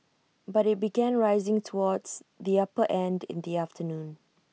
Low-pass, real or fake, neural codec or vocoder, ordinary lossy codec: none; real; none; none